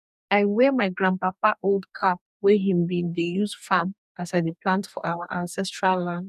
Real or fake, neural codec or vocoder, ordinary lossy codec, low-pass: fake; codec, 44.1 kHz, 2.6 kbps, DAC; none; 14.4 kHz